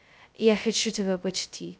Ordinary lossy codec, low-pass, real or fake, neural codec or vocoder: none; none; fake; codec, 16 kHz, 0.2 kbps, FocalCodec